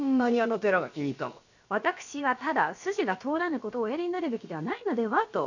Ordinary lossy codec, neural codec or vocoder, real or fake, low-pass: none; codec, 16 kHz, about 1 kbps, DyCAST, with the encoder's durations; fake; 7.2 kHz